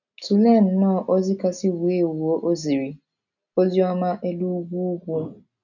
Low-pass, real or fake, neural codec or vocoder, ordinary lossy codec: 7.2 kHz; real; none; none